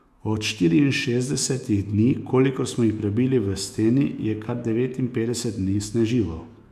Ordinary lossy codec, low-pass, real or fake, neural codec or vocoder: none; 14.4 kHz; fake; autoencoder, 48 kHz, 128 numbers a frame, DAC-VAE, trained on Japanese speech